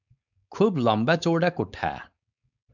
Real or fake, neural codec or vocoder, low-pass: fake; codec, 16 kHz, 4.8 kbps, FACodec; 7.2 kHz